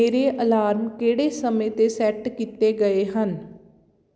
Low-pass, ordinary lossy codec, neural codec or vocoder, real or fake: none; none; none; real